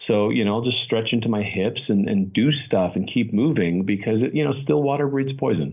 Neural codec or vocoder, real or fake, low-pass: none; real; 3.6 kHz